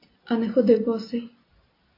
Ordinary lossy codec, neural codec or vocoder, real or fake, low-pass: AAC, 24 kbps; none; real; 5.4 kHz